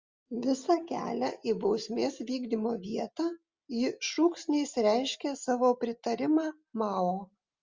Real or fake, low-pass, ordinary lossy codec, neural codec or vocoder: real; 7.2 kHz; Opus, 32 kbps; none